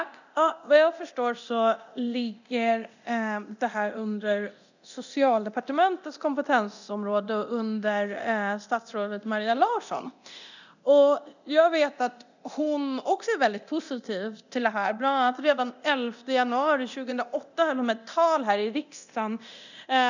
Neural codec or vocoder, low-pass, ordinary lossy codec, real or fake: codec, 24 kHz, 0.9 kbps, DualCodec; 7.2 kHz; none; fake